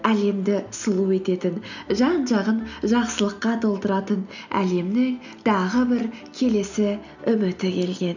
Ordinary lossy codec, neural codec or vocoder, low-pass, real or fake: none; none; 7.2 kHz; real